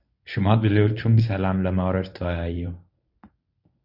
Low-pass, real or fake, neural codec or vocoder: 5.4 kHz; fake; codec, 24 kHz, 0.9 kbps, WavTokenizer, medium speech release version 1